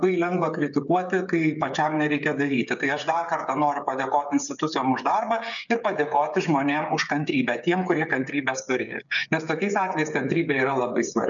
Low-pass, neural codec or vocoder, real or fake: 7.2 kHz; codec, 16 kHz, 8 kbps, FreqCodec, smaller model; fake